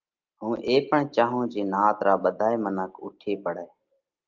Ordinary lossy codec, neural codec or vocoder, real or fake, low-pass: Opus, 32 kbps; none; real; 7.2 kHz